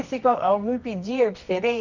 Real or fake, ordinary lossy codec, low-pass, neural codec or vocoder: fake; none; 7.2 kHz; codec, 24 kHz, 0.9 kbps, WavTokenizer, medium music audio release